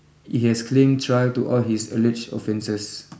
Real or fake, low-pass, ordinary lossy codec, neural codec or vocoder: real; none; none; none